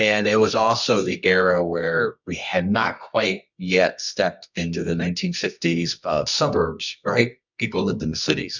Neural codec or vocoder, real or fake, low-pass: codec, 24 kHz, 0.9 kbps, WavTokenizer, medium music audio release; fake; 7.2 kHz